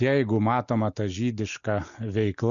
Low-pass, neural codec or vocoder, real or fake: 7.2 kHz; none; real